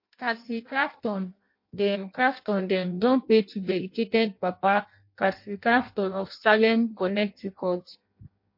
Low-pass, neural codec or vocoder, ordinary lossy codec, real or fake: 5.4 kHz; codec, 16 kHz in and 24 kHz out, 0.6 kbps, FireRedTTS-2 codec; MP3, 32 kbps; fake